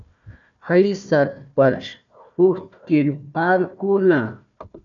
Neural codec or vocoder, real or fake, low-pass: codec, 16 kHz, 1 kbps, FunCodec, trained on Chinese and English, 50 frames a second; fake; 7.2 kHz